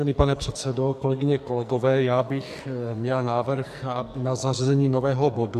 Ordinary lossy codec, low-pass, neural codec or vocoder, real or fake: AAC, 96 kbps; 14.4 kHz; codec, 44.1 kHz, 2.6 kbps, SNAC; fake